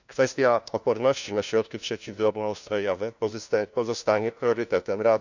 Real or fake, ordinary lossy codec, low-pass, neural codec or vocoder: fake; none; 7.2 kHz; codec, 16 kHz, 1 kbps, FunCodec, trained on LibriTTS, 50 frames a second